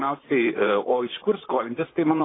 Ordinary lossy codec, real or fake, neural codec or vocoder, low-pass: AAC, 16 kbps; fake; vocoder, 44.1 kHz, 128 mel bands, Pupu-Vocoder; 7.2 kHz